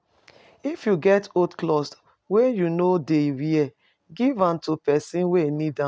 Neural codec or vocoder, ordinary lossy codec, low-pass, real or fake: none; none; none; real